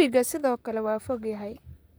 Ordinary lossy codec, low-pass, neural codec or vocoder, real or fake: none; none; vocoder, 44.1 kHz, 128 mel bands, Pupu-Vocoder; fake